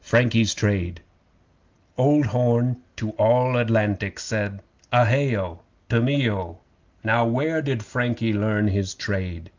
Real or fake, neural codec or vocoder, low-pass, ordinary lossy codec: real; none; 7.2 kHz; Opus, 24 kbps